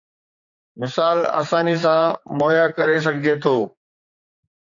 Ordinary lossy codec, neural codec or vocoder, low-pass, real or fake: AAC, 64 kbps; codec, 16 kHz, 4 kbps, X-Codec, HuBERT features, trained on general audio; 7.2 kHz; fake